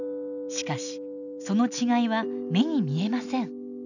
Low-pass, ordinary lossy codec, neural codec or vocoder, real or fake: 7.2 kHz; none; none; real